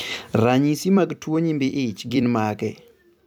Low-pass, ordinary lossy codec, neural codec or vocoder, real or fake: 19.8 kHz; none; vocoder, 44.1 kHz, 128 mel bands every 256 samples, BigVGAN v2; fake